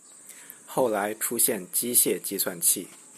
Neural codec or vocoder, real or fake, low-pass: none; real; 14.4 kHz